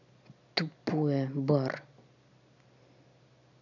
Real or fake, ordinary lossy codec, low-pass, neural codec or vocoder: real; none; 7.2 kHz; none